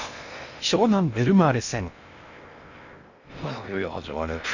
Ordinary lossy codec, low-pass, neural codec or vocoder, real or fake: none; 7.2 kHz; codec, 16 kHz in and 24 kHz out, 0.6 kbps, FocalCodec, streaming, 2048 codes; fake